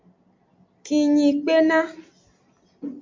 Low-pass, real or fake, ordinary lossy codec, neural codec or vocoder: 7.2 kHz; real; MP3, 48 kbps; none